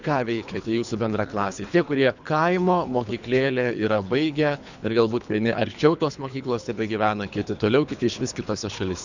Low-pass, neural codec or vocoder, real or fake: 7.2 kHz; codec, 24 kHz, 3 kbps, HILCodec; fake